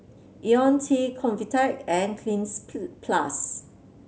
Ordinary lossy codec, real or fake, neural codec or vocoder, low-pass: none; real; none; none